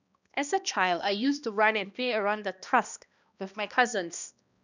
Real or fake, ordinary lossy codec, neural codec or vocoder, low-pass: fake; none; codec, 16 kHz, 1 kbps, X-Codec, HuBERT features, trained on balanced general audio; 7.2 kHz